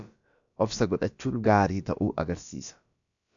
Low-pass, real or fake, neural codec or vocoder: 7.2 kHz; fake; codec, 16 kHz, about 1 kbps, DyCAST, with the encoder's durations